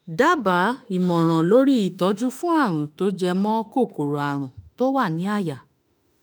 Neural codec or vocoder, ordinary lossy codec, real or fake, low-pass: autoencoder, 48 kHz, 32 numbers a frame, DAC-VAE, trained on Japanese speech; none; fake; none